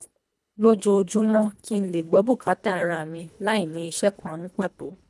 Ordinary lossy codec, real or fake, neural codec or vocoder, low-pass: none; fake; codec, 24 kHz, 1.5 kbps, HILCodec; none